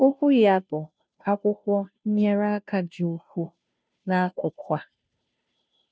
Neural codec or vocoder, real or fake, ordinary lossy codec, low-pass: codec, 16 kHz, 0.5 kbps, FunCodec, trained on Chinese and English, 25 frames a second; fake; none; none